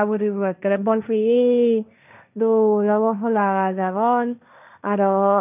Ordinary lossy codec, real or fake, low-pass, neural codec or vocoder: none; fake; 3.6 kHz; codec, 16 kHz, 1.1 kbps, Voila-Tokenizer